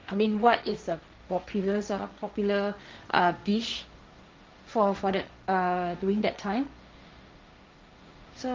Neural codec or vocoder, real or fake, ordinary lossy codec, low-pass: codec, 16 kHz, 1.1 kbps, Voila-Tokenizer; fake; Opus, 32 kbps; 7.2 kHz